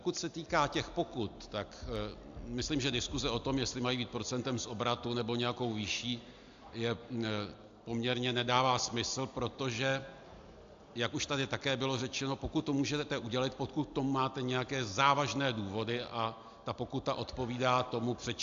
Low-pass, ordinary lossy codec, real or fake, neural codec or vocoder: 7.2 kHz; AAC, 64 kbps; real; none